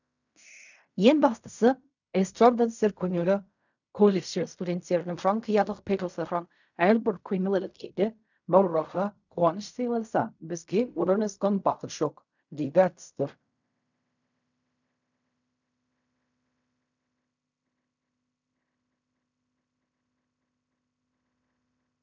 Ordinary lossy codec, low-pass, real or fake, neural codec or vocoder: none; 7.2 kHz; fake; codec, 16 kHz in and 24 kHz out, 0.4 kbps, LongCat-Audio-Codec, fine tuned four codebook decoder